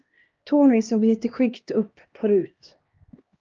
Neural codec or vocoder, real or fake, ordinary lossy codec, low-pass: codec, 16 kHz, 1 kbps, X-Codec, HuBERT features, trained on LibriSpeech; fake; Opus, 24 kbps; 7.2 kHz